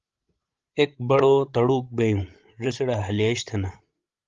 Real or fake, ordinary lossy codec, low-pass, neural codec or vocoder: fake; Opus, 32 kbps; 7.2 kHz; codec, 16 kHz, 16 kbps, FreqCodec, larger model